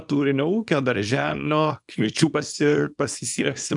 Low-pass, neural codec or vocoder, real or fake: 10.8 kHz; codec, 24 kHz, 0.9 kbps, WavTokenizer, small release; fake